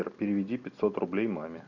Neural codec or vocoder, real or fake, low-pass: none; real; 7.2 kHz